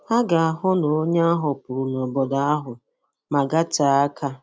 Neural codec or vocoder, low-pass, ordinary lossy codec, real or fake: none; none; none; real